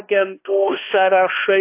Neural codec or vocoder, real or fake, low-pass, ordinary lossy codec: codec, 16 kHz, 1 kbps, X-Codec, HuBERT features, trained on balanced general audio; fake; 3.6 kHz; AAC, 24 kbps